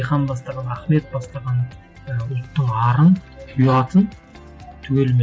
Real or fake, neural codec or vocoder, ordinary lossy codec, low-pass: real; none; none; none